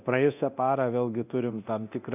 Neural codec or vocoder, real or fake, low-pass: codec, 24 kHz, 0.9 kbps, DualCodec; fake; 3.6 kHz